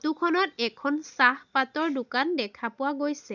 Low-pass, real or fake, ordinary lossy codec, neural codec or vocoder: 7.2 kHz; real; none; none